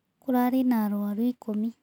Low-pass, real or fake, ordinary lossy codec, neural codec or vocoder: 19.8 kHz; real; none; none